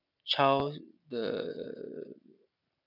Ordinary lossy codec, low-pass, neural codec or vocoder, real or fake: none; 5.4 kHz; none; real